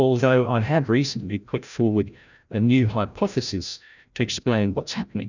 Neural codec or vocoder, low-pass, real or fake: codec, 16 kHz, 0.5 kbps, FreqCodec, larger model; 7.2 kHz; fake